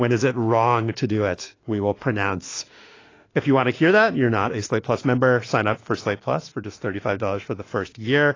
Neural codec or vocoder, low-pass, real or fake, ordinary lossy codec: autoencoder, 48 kHz, 32 numbers a frame, DAC-VAE, trained on Japanese speech; 7.2 kHz; fake; AAC, 32 kbps